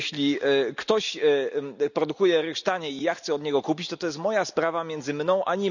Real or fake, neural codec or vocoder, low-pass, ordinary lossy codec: real; none; 7.2 kHz; none